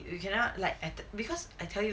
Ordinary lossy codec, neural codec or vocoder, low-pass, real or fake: none; none; none; real